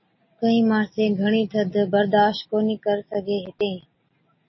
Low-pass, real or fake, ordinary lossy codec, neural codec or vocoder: 7.2 kHz; real; MP3, 24 kbps; none